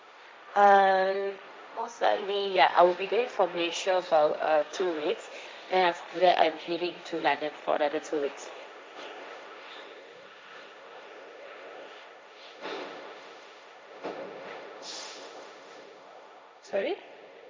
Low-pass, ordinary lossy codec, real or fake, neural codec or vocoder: none; none; fake; codec, 16 kHz, 1.1 kbps, Voila-Tokenizer